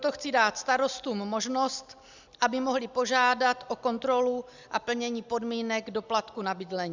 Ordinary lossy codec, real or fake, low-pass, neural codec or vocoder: Opus, 64 kbps; real; 7.2 kHz; none